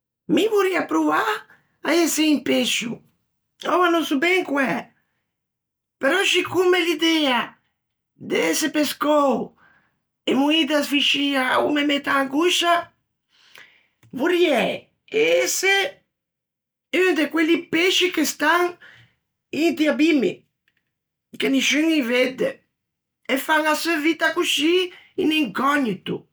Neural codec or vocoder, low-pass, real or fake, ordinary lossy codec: none; none; real; none